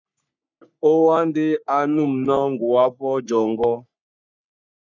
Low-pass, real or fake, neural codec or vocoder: 7.2 kHz; fake; codec, 44.1 kHz, 3.4 kbps, Pupu-Codec